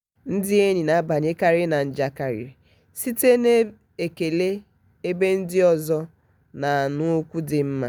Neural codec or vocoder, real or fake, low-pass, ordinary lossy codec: none; real; none; none